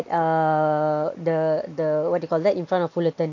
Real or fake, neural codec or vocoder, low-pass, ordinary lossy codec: real; none; 7.2 kHz; AAC, 48 kbps